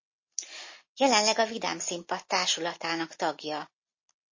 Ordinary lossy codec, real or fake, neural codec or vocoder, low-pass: MP3, 32 kbps; real; none; 7.2 kHz